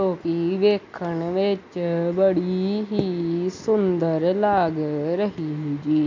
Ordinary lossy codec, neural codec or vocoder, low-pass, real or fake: MP3, 48 kbps; none; 7.2 kHz; real